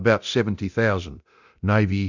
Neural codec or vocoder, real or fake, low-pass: codec, 24 kHz, 0.9 kbps, DualCodec; fake; 7.2 kHz